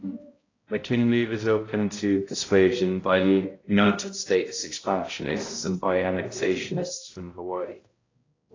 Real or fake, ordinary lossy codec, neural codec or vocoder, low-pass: fake; AAC, 32 kbps; codec, 16 kHz, 0.5 kbps, X-Codec, HuBERT features, trained on balanced general audio; 7.2 kHz